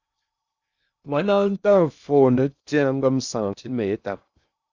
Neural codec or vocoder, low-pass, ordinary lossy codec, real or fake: codec, 16 kHz in and 24 kHz out, 0.6 kbps, FocalCodec, streaming, 2048 codes; 7.2 kHz; Opus, 64 kbps; fake